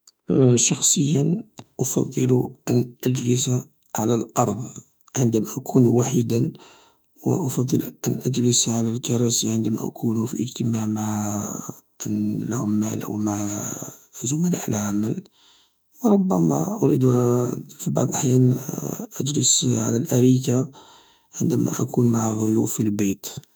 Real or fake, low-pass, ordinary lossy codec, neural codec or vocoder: fake; none; none; autoencoder, 48 kHz, 32 numbers a frame, DAC-VAE, trained on Japanese speech